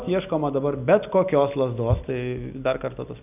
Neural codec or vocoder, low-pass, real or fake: none; 3.6 kHz; real